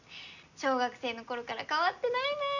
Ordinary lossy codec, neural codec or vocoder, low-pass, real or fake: none; none; 7.2 kHz; real